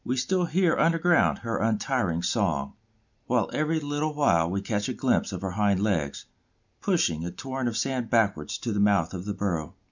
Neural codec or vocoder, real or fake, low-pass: none; real; 7.2 kHz